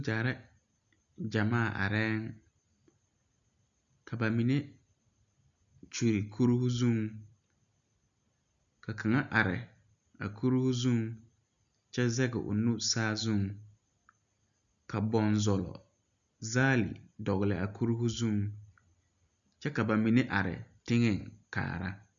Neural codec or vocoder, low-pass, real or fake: none; 7.2 kHz; real